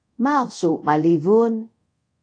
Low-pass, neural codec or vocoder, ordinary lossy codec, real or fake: 9.9 kHz; codec, 24 kHz, 0.5 kbps, DualCodec; AAC, 48 kbps; fake